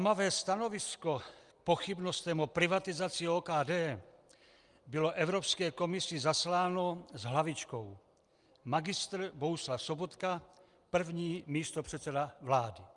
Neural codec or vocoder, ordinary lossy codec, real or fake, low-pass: none; Opus, 32 kbps; real; 10.8 kHz